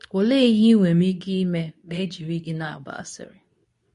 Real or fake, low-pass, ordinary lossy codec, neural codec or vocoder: fake; 10.8 kHz; MP3, 48 kbps; codec, 24 kHz, 0.9 kbps, WavTokenizer, medium speech release version 2